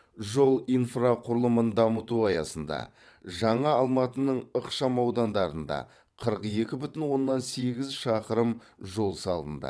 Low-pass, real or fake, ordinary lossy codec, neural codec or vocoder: none; fake; none; vocoder, 22.05 kHz, 80 mel bands, WaveNeXt